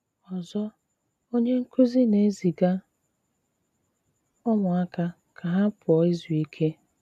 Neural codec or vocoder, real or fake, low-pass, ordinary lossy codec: none; real; 14.4 kHz; none